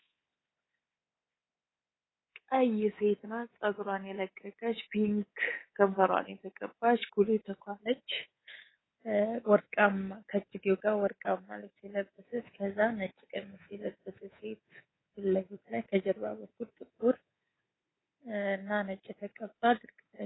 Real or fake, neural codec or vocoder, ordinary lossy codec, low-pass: fake; codec, 24 kHz, 3.1 kbps, DualCodec; AAC, 16 kbps; 7.2 kHz